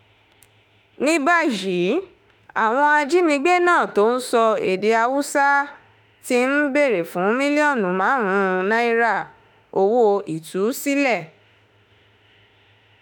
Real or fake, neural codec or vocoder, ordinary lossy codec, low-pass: fake; autoencoder, 48 kHz, 32 numbers a frame, DAC-VAE, trained on Japanese speech; none; none